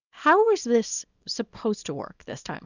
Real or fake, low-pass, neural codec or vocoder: fake; 7.2 kHz; codec, 24 kHz, 0.9 kbps, WavTokenizer, small release